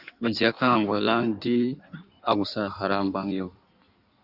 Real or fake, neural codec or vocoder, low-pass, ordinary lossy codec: fake; codec, 16 kHz in and 24 kHz out, 1.1 kbps, FireRedTTS-2 codec; 5.4 kHz; Opus, 64 kbps